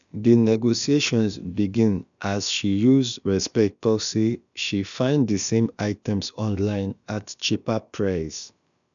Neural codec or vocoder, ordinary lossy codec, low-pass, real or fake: codec, 16 kHz, about 1 kbps, DyCAST, with the encoder's durations; none; 7.2 kHz; fake